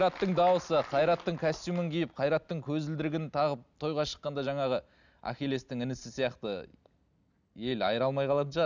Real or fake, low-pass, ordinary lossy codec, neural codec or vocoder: real; 7.2 kHz; none; none